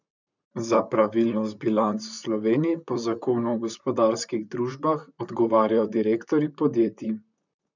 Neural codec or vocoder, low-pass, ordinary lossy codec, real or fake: vocoder, 44.1 kHz, 128 mel bands, Pupu-Vocoder; 7.2 kHz; none; fake